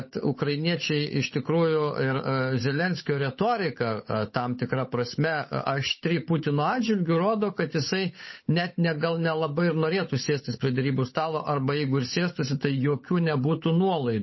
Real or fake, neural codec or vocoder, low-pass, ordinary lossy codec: fake; codec, 16 kHz, 8 kbps, FunCodec, trained on Chinese and English, 25 frames a second; 7.2 kHz; MP3, 24 kbps